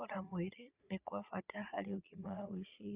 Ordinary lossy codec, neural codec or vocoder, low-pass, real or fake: Opus, 64 kbps; vocoder, 22.05 kHz, 80 mel bands, Vocos; 3.6 kHz; fake